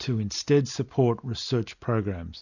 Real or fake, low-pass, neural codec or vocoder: real; 7.2 kHz; none